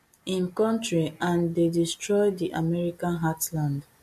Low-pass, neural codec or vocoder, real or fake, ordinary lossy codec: 14.4 kHz; none; real; MP3, 64 kbps